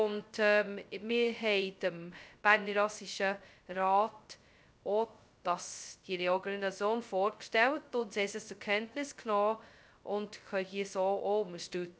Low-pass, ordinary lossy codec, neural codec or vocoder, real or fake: none; none; codec, 16 kHz, 0.2 kbps, FocalCodec; fake